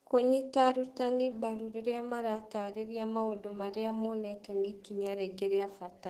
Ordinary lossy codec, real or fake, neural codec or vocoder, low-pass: Opus, 16 kbps; fake; codec, 32 kHz, 1.9 kbps, SNAC; 14.4 kHz